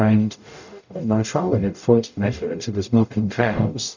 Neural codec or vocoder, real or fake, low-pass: codec, 44.1 kHz, 0.9 kbps, DAC; fake; 7.2 kHz